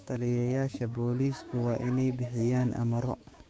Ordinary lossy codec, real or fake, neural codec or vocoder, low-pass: none; fake; codec, 16 kHz, 6 kbps, DAC; none